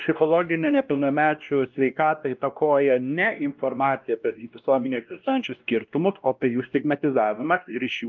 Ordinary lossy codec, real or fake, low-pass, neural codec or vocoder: Opus, 32 kbps; fake; 7.2 kHz; codec, 16 kHz, 1 kbps, X-Codec, WavLM features, trained on Multilingual LibriSpeech